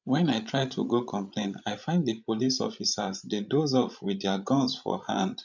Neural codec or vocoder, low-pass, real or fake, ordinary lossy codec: codec, 16 kHz, 16 kbps, FreqCodec, larger model; 7.2 kHz; fake; none